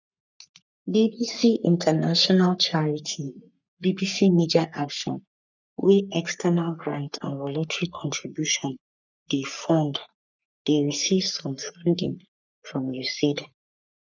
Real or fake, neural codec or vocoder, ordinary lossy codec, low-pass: fake; codec, 44.1 kHz, 3.4 kbps, Pupu-Codec; none; 7.2 kHz